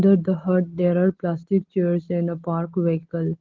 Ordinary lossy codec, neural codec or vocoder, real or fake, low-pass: Opus, 16 kbps; none; real; 7.2 kHz